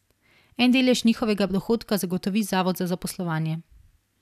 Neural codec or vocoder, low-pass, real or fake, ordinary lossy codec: none; 14.4 kHz; real; none